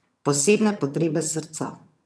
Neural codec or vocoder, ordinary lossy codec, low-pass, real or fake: vocoder, 22.05 kHz, 80 mel bands, HiFi-GAN; none; none; fake